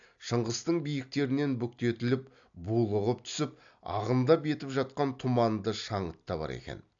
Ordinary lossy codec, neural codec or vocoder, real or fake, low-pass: AAC, 64 kbps; none; real; 7.2 kHz